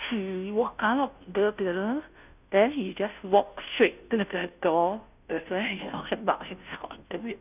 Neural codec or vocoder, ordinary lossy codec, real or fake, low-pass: codec, 16 kHz, 0.5 kbps, FunCodec, trained on Chinese and English, 25 frames a second; none; fake; 3.6 kHz